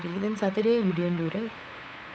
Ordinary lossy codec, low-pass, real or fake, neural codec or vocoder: none; none; fake; codec, 16 kHz, 8 kbps, FunCodec, trained on LibriTTS, 25 frames a second